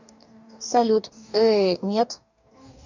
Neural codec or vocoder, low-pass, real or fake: codec, 44.1 kHz, 2.6 kbps, DAC; 7.2 kHz; fake